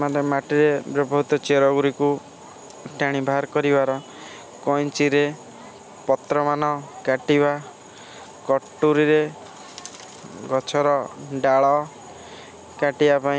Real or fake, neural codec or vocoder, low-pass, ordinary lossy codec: real; none; none; none